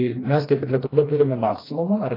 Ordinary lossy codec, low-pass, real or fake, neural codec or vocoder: AAC, 24 kbps; 5.4 kHz; fake; codec, 16 kHz, 2 kbps, FreqCodec, smaller model